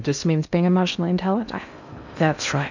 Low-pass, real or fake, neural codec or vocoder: 7.2 kHz; fake; codec, 16 kHz in and 24 kHz out, 0.6 kbps, FocalCodec, streaming, 2048 codes